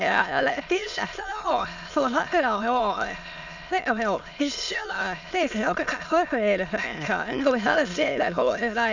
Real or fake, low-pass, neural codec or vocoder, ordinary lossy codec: fake; 7.2 kHz; autoencoder, 22.05 kHz, a latent of 192 numbers a frame, VITS, trained on many speakers; none